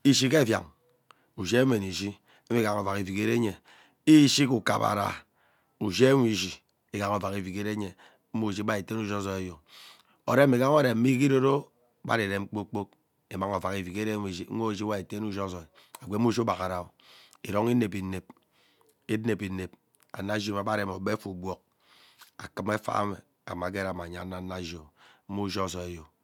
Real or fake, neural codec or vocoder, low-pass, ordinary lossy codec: real; none; 19.8 kHz; none